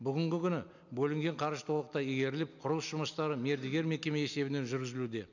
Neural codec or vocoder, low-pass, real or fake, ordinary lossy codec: none; 7.2 kHz; real; none